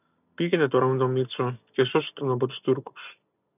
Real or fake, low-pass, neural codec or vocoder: real; 3.6 kHz; none